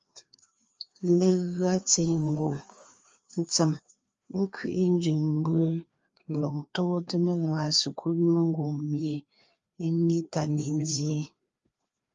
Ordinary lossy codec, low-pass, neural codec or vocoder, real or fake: Opus, 32 kbps; 7.2 kHz; codec, 16 kHz, 2 kbps, FreqCodec, larger model; fake